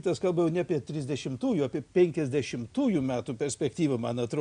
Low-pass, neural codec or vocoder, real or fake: 9.9 kHz; none; real